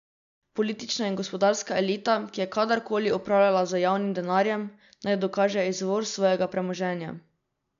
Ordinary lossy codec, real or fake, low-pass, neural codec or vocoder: none; real; 7.2 kHz; none